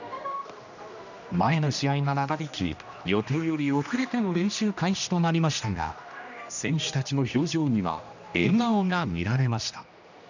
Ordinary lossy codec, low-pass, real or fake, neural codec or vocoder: none; 7.2 kHz; fake; codec, 16 kHz, 1 kbps, X-Codec, HuBERT features, trained on general audio